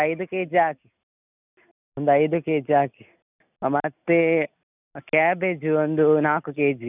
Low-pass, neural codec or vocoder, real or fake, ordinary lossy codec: 3.6 kHz; none; real; none